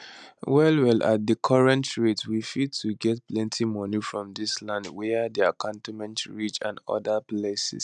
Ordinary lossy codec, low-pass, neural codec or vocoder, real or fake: none; 10.8 kHz; none; real